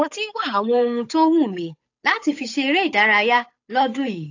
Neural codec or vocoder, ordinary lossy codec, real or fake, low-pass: codec, 16 kHz, 8 kbps, FunCodec, trained on Chinese and English, 25 frames a second; AAC, 48 kbps; fake; 7.2 kHz